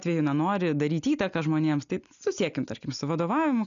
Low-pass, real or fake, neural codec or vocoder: 7.2 kHz; real; none